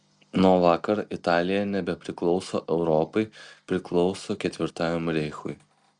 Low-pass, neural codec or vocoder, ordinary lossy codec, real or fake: 9.9 kHz; none; Opus, 64 kbps; real